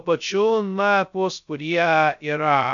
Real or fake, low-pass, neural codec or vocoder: fake; 7.2 kHz; codec, 16 kHz, 0.2 kbps, FocalCodec